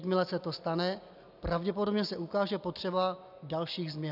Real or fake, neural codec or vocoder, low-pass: real; none; 5.4 kHz